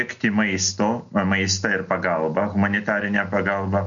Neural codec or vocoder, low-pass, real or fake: none; 7.2 kHz; real